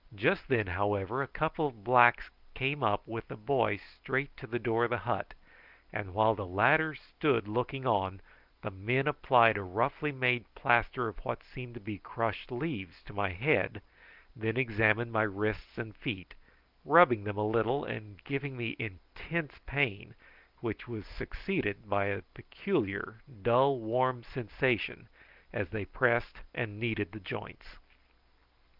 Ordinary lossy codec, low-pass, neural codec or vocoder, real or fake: Opus, 32 kbps; 5.4 kHz; none; real